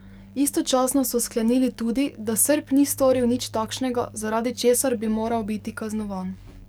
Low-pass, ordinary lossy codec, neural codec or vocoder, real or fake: none; none; codec, 44.1 kHz, 7.8 kbps, DAC; fake